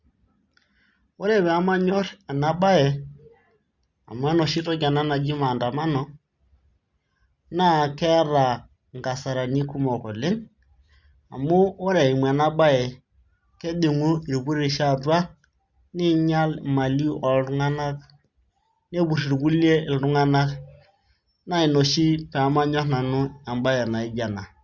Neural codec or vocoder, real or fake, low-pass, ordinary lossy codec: none; real; 7.2 kHz; Opus, 64 kbps